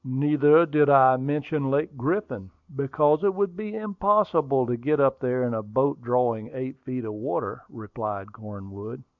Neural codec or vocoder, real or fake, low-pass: none; real; 7.2 kHz